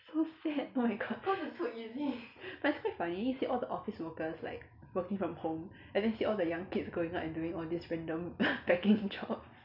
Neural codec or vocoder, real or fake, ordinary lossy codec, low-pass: none; real; none; 5.4 kHz